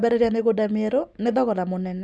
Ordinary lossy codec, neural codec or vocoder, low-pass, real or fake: none; none; none; real